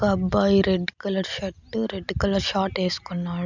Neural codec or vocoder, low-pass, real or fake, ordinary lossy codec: none; 7.2 kHz; real; none